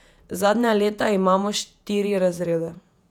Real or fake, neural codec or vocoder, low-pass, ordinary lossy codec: fake; vocoder, 48 kHz, 128 mel bands, Vocos; 19.8 kHz; none